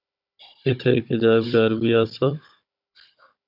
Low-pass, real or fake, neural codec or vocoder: 5.4 kHz; fake; codec, 16 kHz, 16 kbps, FunCodec, trained on Chinese and English, 50 frames a second